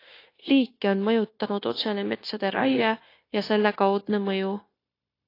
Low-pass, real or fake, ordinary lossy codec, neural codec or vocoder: 5.4 kHz; fake; AAC, 32 kbps; codec, 16 kHz, 0.9 kbps, LongCat-Audio-Codec